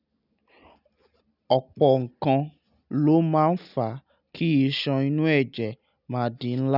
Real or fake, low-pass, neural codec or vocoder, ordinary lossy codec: real; 5.4 kHz; none; none